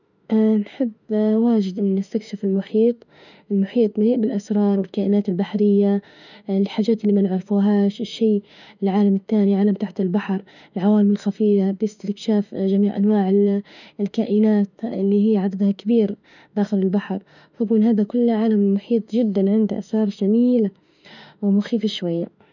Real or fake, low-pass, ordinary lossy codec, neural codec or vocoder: fake; 7.2 kHz; none; autoencoder, 48 kHz, 32 numbers a frame, DAC-VAE, trained on Japanese speech